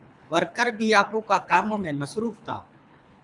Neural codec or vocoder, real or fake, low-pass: codec, 24 kHz, 3 kbps, HILCodec; fake; 10.8 kHz